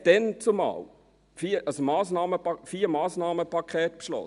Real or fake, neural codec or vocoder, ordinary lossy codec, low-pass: real; none; none; 10.8 kHz